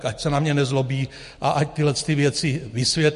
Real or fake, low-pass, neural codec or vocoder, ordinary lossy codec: real; 14.4 kHz; none; MP3, 48 kbps